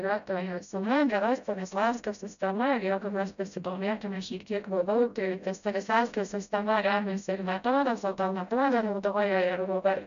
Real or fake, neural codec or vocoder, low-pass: fake; codec, 16 kHz, 0.5 kbps, FreqCodec, smaller model; 7.2 kHz